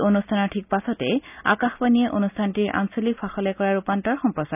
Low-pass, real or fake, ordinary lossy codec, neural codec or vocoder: 3.6 kHz; real; none; none